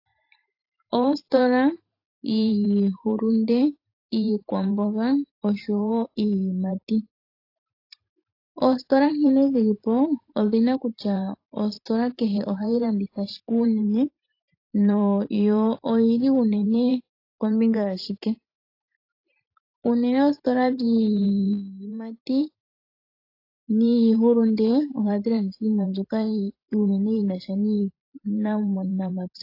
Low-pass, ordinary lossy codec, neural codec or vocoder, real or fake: 5.4 kHz; AAC, 32 kbps; vocoder, 44.1 kHz, 128 mel bands every 512 samples, BigVGAN v2; fake